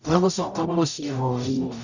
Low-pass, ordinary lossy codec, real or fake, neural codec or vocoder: 7.2 kHz; none; fake; codec, 44.1 kHz, 0.9 kbps, DAC